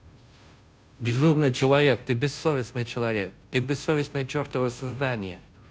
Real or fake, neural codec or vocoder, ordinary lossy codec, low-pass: fake; codec, 16 kHz, 0.5 kbps, FunCodec, trained on Chinese and English, 25 frames a second; none; none